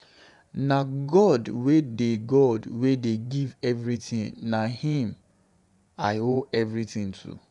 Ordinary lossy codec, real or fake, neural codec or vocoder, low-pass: none; fake; vocoder, 24 kHz, 100 mel bands, Vocos; 10.8 kHz